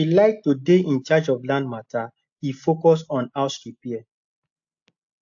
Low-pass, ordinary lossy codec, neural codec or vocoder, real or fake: 7.2 kHz; AAC, 64 kbps; none; real